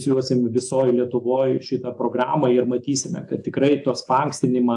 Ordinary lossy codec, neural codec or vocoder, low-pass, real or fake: AAC, 64 kbps; autoencoder, 48 kHz, 128 numbers a frame, DAC-VAE, trained on Japanese speech; 10.8 kHz; fake